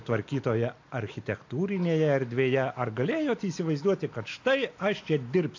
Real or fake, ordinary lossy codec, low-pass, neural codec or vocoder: real; AAC, 48 kbps; 7.2 kHz; none